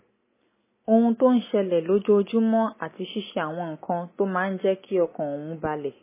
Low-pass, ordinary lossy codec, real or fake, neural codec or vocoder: 3.6 kHz; MP3, 16 kbps; real; none